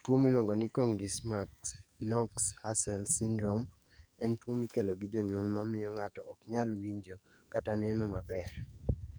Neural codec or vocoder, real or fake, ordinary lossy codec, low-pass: codec, 44.1 kHz, 2.6 kbps, SNAC; fake; none; none